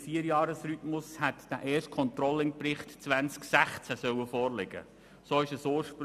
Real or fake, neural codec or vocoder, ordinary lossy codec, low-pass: real; none; none; 14.4 kHz